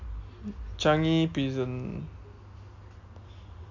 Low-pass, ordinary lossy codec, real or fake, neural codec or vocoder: 7.2 kHz; MP3, 64 kbps; real; none